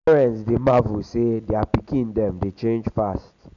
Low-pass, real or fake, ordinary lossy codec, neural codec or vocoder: 7.2 kHz; real; none; none